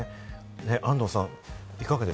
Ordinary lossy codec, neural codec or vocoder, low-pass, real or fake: none; none; none; real